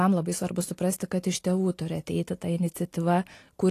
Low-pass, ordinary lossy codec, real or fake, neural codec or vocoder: 14.4 kHz; AAC, 48 kbps; real; none